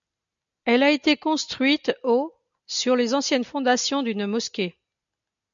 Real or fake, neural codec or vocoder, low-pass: real; none; 7.2 kHz